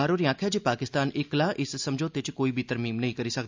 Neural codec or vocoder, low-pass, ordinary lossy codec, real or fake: none; 7.2 kHz; MP3, 64 kbps; real